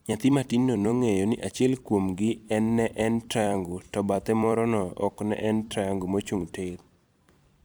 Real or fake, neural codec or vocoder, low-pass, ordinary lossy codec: fake; vocoder, 44.1 kHz, 128 mel bands every 256 samples, BigVGAN v2; none; none